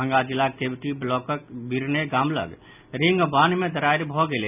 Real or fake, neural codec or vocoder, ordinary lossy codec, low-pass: real; none; none; 3.6 kHz